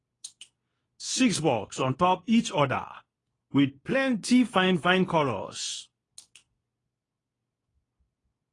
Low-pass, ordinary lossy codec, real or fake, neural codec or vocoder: 10.8 kHz; AAC, 32 kbps; fake; codec, 24 kHz, 0.9 kbps, WavTokenizer, medium speech release version 2